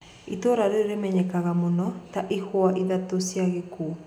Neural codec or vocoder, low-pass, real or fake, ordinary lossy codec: none; 19.8 kHz; real; none